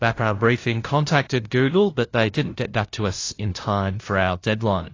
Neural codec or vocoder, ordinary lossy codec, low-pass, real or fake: codec, 16 kHz, 0.5 kbps, FunCodec, trained on LibriTTS, 25 frames a second; AAC, 32 kbps; 7.2 kHz; fake